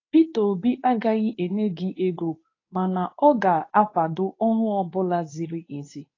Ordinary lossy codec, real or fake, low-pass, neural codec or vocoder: AAC, 32 kbps; fake; 7.2 kHz; codec, 24 kHz, 0.9 kbps, WavTokenizer, medium speech release version 2